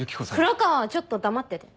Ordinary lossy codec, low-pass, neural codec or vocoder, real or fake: none; none; none; real